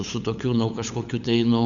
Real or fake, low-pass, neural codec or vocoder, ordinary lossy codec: fake; 7.2 kHz; codec, 16 kHz, 8 kbps, FunCodec, trained on LibriTTS, 25 frames a second; Opus, 64 kbps